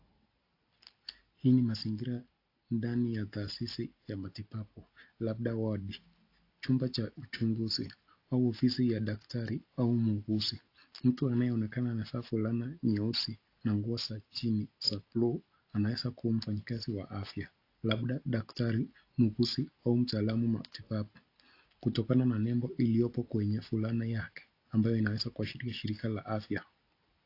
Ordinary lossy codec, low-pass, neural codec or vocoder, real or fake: AAC, 32 kbps; 5.4 kHz; none; real